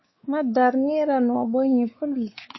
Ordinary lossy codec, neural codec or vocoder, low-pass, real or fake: MP3, 24 kbps; codec, 16 kHz, 4 kbps, X-Codec, WavLM features, trained on Multilingual LibriSpeech; 7.2 kHz; fake